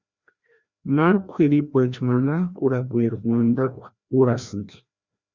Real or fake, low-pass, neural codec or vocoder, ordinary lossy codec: fake; 7.2 kHz; codec, 16 kHz, 1 kbps, FreqCodec, larger model; Opus, 64 kbps